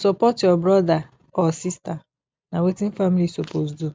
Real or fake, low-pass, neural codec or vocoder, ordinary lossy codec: real; none; none; none